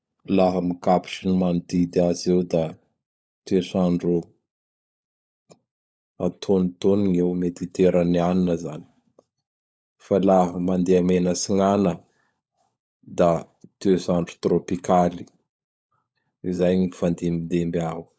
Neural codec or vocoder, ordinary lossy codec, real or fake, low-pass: codec, 16 kHz, 16 kbps, FunCodec, trained on LibriTTS, 50 frames a second; none; fake; none